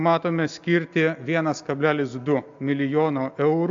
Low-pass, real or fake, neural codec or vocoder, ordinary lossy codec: 7.2 kHz; real; none; AAC, 64 kbps